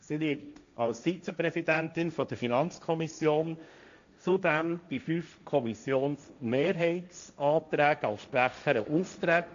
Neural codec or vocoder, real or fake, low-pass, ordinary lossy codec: codec, 16 kHz, 1.1 kbps, Voila-Tokenizer; fake; 7.2 kHz; MP3, 64 kbps